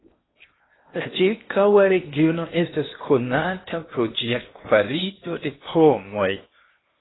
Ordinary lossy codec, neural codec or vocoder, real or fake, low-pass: AAC, 16 kbps; codec, 16 kHz in and 24 kHz out, 0.8 kbps, FocalCodec, streaming, 65536 codes; fake; 7.2 kHz